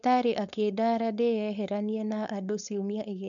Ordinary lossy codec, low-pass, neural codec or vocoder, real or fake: none; 7.2 kHz; codec, 16 kHz, 4.8 kbps, FACodec; fake